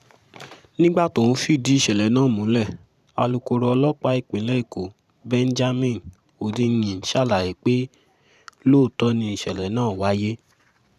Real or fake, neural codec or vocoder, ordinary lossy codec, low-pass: fake; vocoder, 44.1 kHz, 128 mel bands every 512 samples, BigVGAN v2; none; 14.4 kHz